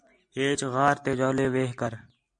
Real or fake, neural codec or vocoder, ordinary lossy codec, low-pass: real; none; MP3, 64 kbps; 9.9 kHz